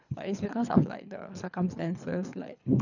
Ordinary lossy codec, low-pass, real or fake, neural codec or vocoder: none; 7.2 kHz; fake; codec, 24 kHz, 3 kbps, HILCodec